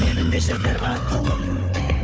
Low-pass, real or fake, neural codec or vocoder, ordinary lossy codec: none; fake; codec, 16 kHz, 4 kbps, FunCodec, trained on Chinese and English, 50 frames a second; none